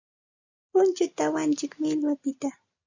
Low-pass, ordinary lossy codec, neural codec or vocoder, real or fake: 7.2 kHz; Opus, 64 kbps; none; real